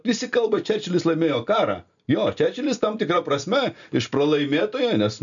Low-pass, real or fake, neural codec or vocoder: 7.2 kHz; real; none